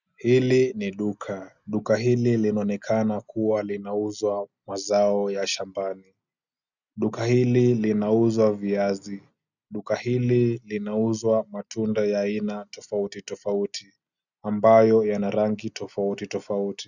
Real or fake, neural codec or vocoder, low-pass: real; none; 7.2 kHz